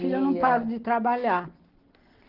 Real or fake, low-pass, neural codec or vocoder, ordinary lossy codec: real; 5.4 kHz; none; Opus, 16 kbps